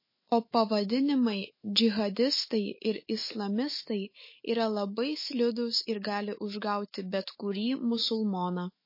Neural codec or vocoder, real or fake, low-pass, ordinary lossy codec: autoencoder, 48 kHz, 128 numbers a frame, DAC-VAE, trained on Japanese speech; fake; 5.4 kHz; MP3, 24 kbps